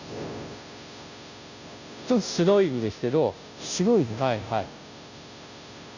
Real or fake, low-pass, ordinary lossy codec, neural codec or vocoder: fake; 7.2 kHz; none; codec, 16 kHz, 0.5 kbps, FunCodec, trained on Chinese and English, 25 frames a second